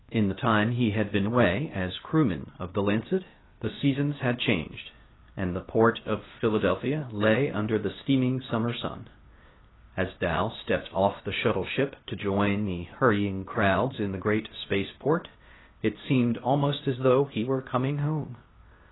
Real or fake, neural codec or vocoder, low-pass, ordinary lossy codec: fake; codec, 16 kHz, 0.8 kbps, ZipCodec; 7.2 kHz; AAC, 16 kbps